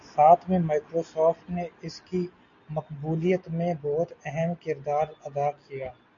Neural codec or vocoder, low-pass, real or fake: none; 7.2 kHz; real